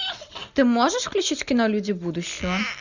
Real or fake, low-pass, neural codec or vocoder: real; 7.2 kHz; none